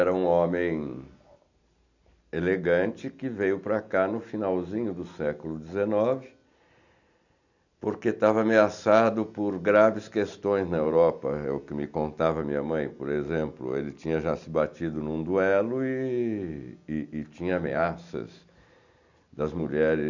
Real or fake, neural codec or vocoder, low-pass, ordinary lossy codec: real; none; 7.2 kHz; none